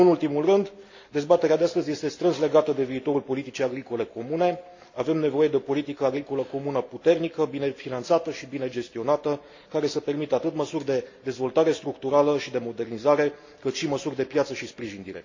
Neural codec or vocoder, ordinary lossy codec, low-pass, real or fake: none; AAC, 48 kbps; 7.2 kHz; real